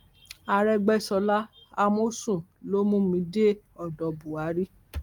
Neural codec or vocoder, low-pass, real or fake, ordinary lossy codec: none; 19.8 kHz; real; Opus, 24 kbps